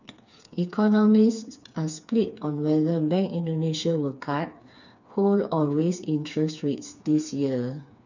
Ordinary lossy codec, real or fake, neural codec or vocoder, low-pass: none; fake; codec, 16 kHz, 4 kbps, FreqCodec, smaller model; 7.2 kHz